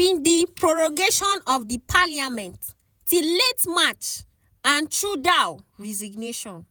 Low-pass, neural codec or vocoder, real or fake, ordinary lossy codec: none; vocoder, 48 kHz, 128 mel bands, Vocos; fake; none